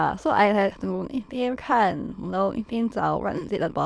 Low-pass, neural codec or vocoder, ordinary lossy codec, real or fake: none; autoencoder, 22.05 kHz, a latent of 192 numbers a frame, VITS, trained on many speakers; none; fake